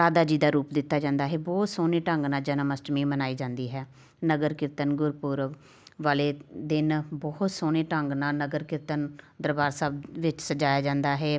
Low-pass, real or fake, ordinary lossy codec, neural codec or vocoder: none; real; none; none